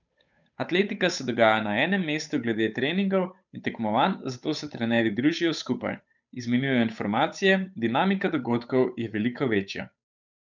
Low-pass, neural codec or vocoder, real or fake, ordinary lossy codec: 7.2 kHz; codec, 16 kHz, 8 kbps, FunCodec, trained on Chinese and English, 25 frames a second; fake; none